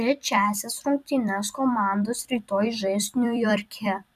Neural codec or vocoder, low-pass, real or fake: vocoder, 44.1 kHz, 128 mel bands every 256 samples, BigVGAN v2; 14.4 kHz; fake